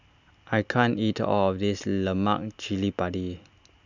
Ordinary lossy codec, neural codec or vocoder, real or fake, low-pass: none; none; real; 7.2 kHz